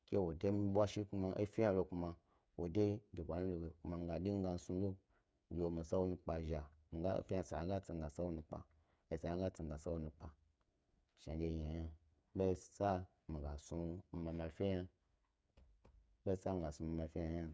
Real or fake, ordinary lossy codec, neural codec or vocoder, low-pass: fake; none; codec, 16 kHz, 8 kbps, FreqCodec, smaller model; none